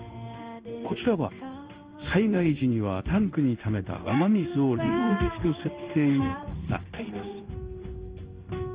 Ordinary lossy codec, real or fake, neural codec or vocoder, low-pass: Opus, 24 kbps; fake; codec, 16 kHz in and 24 kHz out, 1 kbps, XY-Tokenizer; 3.6 kHz